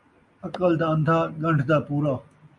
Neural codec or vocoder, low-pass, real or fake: none; 10.8 kHz; real